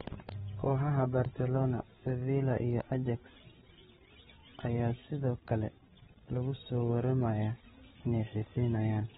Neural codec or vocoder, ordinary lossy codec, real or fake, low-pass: codec, 16 kHz, 8 kbps, FreqCodec, larger model; AAC, 16 kbps; fake; 7.2 kHz